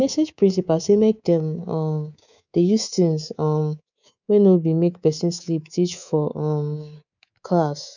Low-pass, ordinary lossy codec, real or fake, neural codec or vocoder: 7.2 kHz; none; fake; codec, 24 kHz, 3.1 kbps, DualCodec